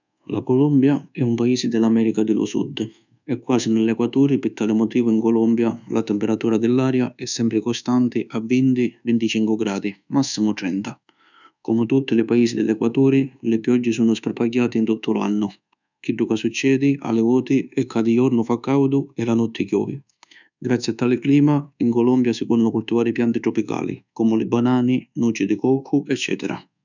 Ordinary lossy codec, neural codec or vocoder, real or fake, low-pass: none; codec, 24 kHz, 1.2 kbps, DualCodec; fake; 7.2 kHz